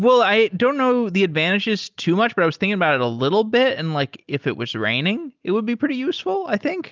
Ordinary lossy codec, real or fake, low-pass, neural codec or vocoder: Opus, 24 kbps; real; 7.2 kHz; none